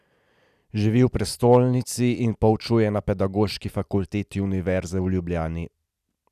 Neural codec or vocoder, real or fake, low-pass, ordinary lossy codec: none; real; 14.4 kHz; none